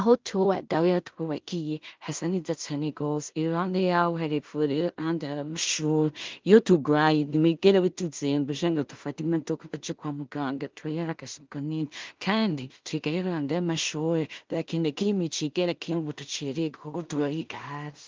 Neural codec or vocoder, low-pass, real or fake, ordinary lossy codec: codec, 16 kHz in and 24 kHz out, 0.4 kbps, LongCat-Audio-Codec, two codebook decoder; 7.2 kHz; fake; Opus, 32 kbps